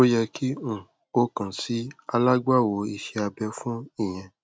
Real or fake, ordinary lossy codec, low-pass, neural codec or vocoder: real; none; none; none